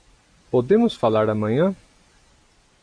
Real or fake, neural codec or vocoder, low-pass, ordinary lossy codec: real; none; 9.9 kHz; MP3, 96 kbps